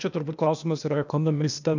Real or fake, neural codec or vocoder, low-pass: fake; codec, 16 kHz, 0.8 kbps, ZipCodec; 7.2 kHz